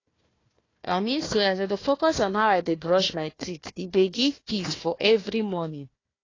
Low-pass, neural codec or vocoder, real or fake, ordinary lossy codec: 7.2 kHz; codec, 16 kHz, 1 kbps, FunCodec, trained on Chinese and English, 50 frames a second; fake; AAC, 32 kbps